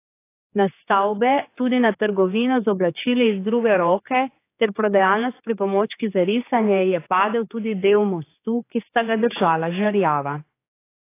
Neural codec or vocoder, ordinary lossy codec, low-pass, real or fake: codec, 16 kHz, 4 kbps, X-Codec, HuBERT features, trained on general audio; AAC, 24 kbps; 3.6 kHz; fake